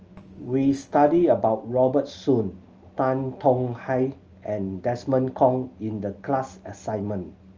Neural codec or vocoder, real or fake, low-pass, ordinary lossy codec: none; real; 7.2 kHz; Opus, 24 kbps